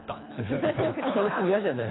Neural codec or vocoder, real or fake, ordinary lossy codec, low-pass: codec, 24 kHz, 3 kbps, HILCodec; fake; AAC, 16 kbps; 7.2 kHz